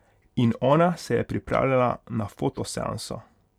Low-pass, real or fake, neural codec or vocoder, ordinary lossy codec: 19.8 kHz; real; none; none